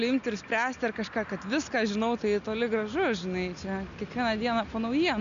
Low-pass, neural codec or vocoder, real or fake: 7.2 kHz; none; real